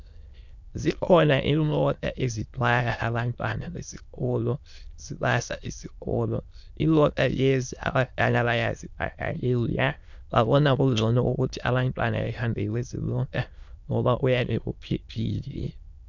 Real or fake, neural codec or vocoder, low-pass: fake; autoencoder, 22.05 kHz, a latent of 192 numbers a frame, VITS, trained on many speakers; 7.2 kHz